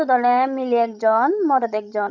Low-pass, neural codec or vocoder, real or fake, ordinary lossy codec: 7.2 kHz; codec, 16 kHz, 16 kbps, FreqCodec, larger model; fake; none